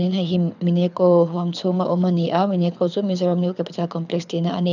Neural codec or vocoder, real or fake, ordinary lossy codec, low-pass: codec, 24 kHz, 6 kbps, HILCodec; fake; none; 7.2 kHz